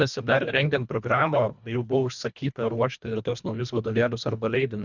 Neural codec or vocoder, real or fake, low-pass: codec, 24 kHz, 1.5 kbps, HILCodec; fake; 7.2 kHz